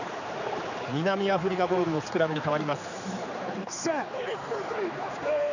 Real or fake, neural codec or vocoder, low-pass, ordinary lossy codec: fake; codec, 16 kHz, 4 kbps, X-Codec, HuBERT features, trained on general audio; 7.2 kHz; none